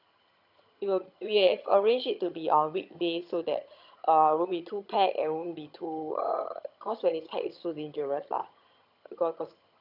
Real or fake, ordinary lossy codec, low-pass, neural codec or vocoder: fake; none; 5.4 kHz; vocoder, 22.05 kHz, 80 mel bands, HiFi-GAN